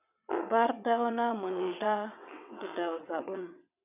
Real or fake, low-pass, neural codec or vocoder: fake; 3.6 kHz; vocoder, 22.05 kHz, 80 mel bands, WaveNeXt